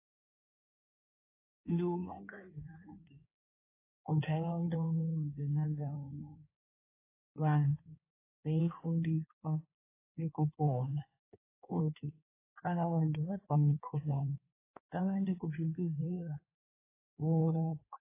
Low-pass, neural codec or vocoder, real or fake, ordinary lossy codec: 3.6 kHz; codec, 16 kHz in and 24 kHz out, 1.1 kbps, FireRedTTS-2 codec; fake; AAC, 16 kbps